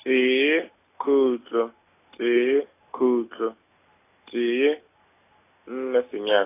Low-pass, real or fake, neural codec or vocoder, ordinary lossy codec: 3.6 kHz; real; none; AAC, 24 kbps